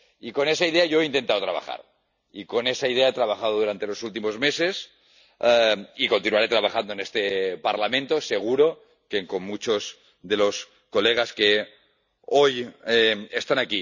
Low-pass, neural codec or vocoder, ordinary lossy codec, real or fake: 7.2 kHz; none; none; real